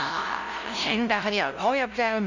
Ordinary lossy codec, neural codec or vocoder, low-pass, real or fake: none; codec, 16 kHz, 0.5 kbps, FunCodec, trained on LibriTTS, 25 frames a second; 7.2 kHz; fake